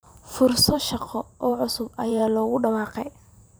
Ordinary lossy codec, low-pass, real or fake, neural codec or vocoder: none; none; fake; vocoder, 44.1 kHz, 128 mel bands every 512 samples, BigVGAN v2